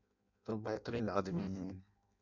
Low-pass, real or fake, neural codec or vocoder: 7.2 kHz; fake; codec, 16 kHz in and 24 kHz out, 0.6 kbps, FireRedTTS-2 codec